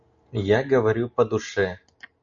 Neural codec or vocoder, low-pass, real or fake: none; 7.2 kHz; real